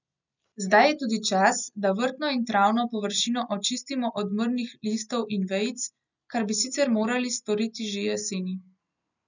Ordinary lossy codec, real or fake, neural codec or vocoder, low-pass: none; real; none; 7.2 kHz